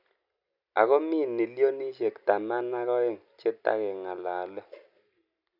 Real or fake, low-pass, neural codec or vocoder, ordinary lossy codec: real; 5.4 kHz; none; none